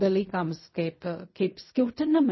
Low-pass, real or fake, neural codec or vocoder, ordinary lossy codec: 7.2 kHz; fake; codec, 16 kHz in and 24 kHz out, 0.4 kbps, LongCat-Audio-Codec, fine tuned four codebook decoder; MP3, 24 kbps